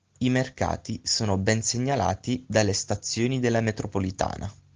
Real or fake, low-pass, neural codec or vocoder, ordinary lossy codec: real; 7.2 kHz; none; Opus, 16 kbps